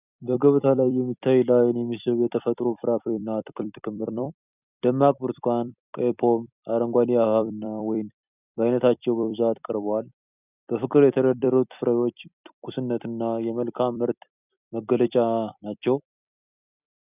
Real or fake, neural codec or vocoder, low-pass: fake; vocoder, 44.1 kHz, 128 mel bands every 256 samples, BigVGAN v2; 3.6 kHz